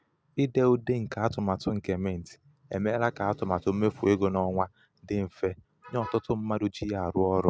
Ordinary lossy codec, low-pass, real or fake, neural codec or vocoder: none; none; real; none